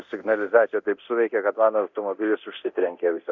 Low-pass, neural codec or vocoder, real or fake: 7.2 kHz; codec, 24 kHz, 0.9 kbps, DualCodec; fake